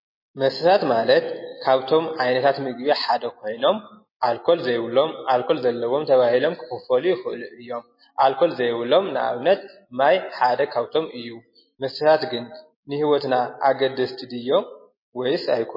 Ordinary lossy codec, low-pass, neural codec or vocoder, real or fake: MP3, 24 kbps; 5.4 kHz; none; real